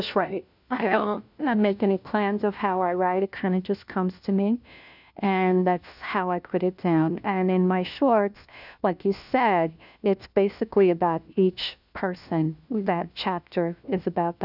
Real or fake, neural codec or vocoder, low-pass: fake; codec, 16 kHz, 1 kbps, FunCodec, trained on LibriTTS, 50 frames a second; 5.4 kHz